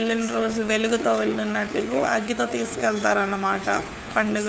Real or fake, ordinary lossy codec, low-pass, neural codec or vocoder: fake; none; none; codec, 16 kHz, 4 kbps, FunCodec, trained on LibriTTS, 50 frames a second